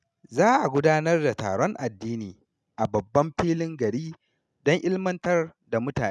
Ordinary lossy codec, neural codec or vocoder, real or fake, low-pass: none; none; real; none